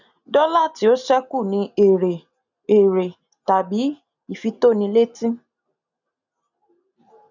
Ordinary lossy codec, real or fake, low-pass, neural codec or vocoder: none; real; 7.2 kHz; none